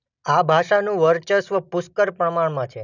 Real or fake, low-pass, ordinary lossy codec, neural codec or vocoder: real; 7.2 kHz; none; none